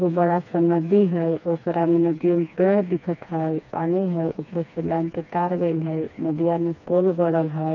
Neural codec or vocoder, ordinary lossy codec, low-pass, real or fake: codec, 16 kHz, 2 kbps, FreqCodec, smaller model; none; 7.2 kHz; fake